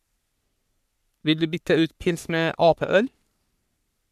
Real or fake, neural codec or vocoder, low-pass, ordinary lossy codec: fake; codec, 44.1 kHz, 3.4 kbps, Pupu-Codec; 14.4 kHz; none